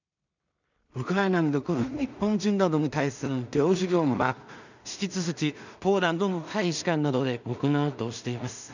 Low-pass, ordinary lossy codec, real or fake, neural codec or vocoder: 7.2 kHz; none; fake; codec, 16 kHz in and 24 kHz out, 0.4 kbps, LongCat-Audio-Codec, two codebook decoder